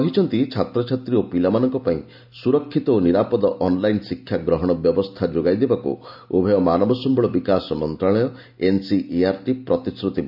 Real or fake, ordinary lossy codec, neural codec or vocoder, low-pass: real; AAC, 48 kbps; none; 5.4 kHz